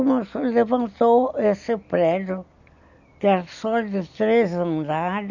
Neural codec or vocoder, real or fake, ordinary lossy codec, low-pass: none; real; none; 7.2 kHz